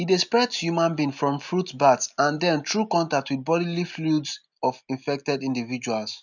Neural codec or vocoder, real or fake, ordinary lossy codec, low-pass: none; real; none; 7.2 kHz